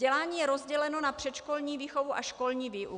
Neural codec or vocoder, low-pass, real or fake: none; 9.9 kHz; real